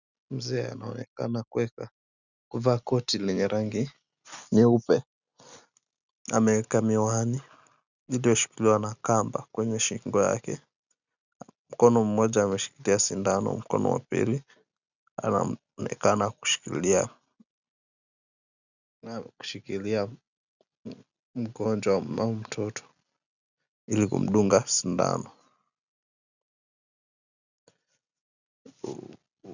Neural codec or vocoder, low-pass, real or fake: none; 7.2 kHz; real